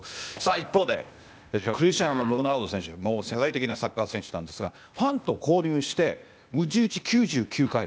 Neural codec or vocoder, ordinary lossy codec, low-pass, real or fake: codec, 16 kHz, 0.8 kbps, ZipCodec; none; none; fake